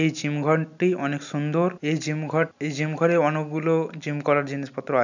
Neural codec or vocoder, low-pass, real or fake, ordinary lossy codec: none; 7.2 kHz; real; none